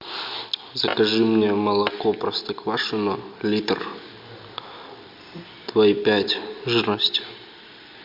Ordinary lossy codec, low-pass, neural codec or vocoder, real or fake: MP3, 48 kbps; 5.4 kHz; none; real